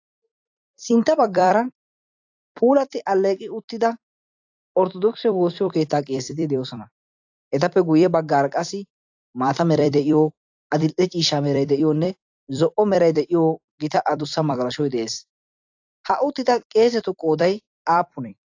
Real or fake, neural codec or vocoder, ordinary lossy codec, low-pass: fake; vocoder, 44.1 kHz, 128 mel bands every 256 samples, BigVGAN v2; AAC, 48 kbps; 7.2 kHz